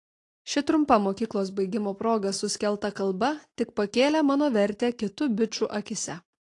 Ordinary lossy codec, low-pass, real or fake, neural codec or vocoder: AAC, 48 kbps; 10.8 kHz; real; none